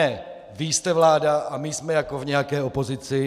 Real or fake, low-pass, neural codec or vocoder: real; 14.4 kHz; none